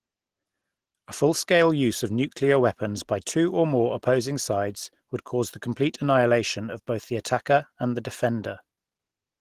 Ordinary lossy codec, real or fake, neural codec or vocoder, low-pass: Opus, 16 kbps; real; none; 14.4 kHz